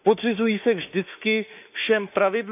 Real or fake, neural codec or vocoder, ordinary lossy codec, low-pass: fake; autoencoder, 48 kHz, 32 numbers a frame, DAC-VAE, trained on Japanese speech; none; 3.6 kHz